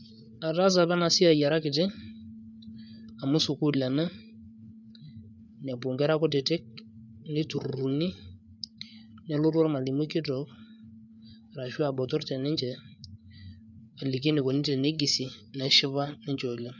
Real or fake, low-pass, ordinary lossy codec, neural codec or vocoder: fake; 7.2 kHz; none; codec, 16 kHz, 8 kbps, FreqCodec, larger model